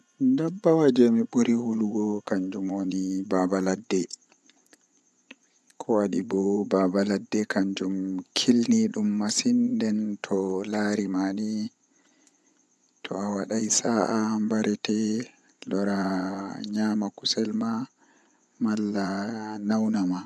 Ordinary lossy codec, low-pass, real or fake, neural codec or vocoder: none; none; fake; vocoder, 24 kHz, 100 mel bands, Vocos